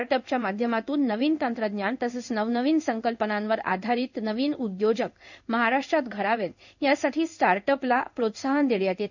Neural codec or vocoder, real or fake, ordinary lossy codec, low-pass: codec, 16 kHz in and 24 kHz out, 1 kbps, XY-Tokenizer; fake; none; 7.2 kHz